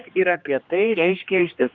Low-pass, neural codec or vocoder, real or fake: 7.2 kHz; codec, 16 kHz, 2 kbps, X-Codec, HuBERT features, trained on general audio; fake